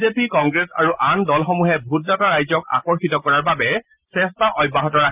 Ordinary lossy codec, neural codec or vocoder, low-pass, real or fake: Opus, 24 kbps; none; 3.6 kHz; real